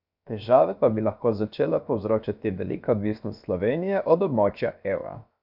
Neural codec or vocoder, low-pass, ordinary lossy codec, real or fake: codec, 16 kHz, about 1 kbps, DyCAST, with the encoder's durations; 5.4 kHz; none; fake